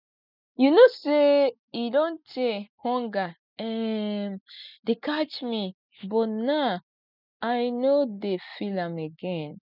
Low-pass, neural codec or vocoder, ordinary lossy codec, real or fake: 5.4 kHz; none; none; real